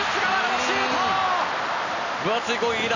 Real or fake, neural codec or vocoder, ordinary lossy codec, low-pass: real; none; none; 7.2 kHz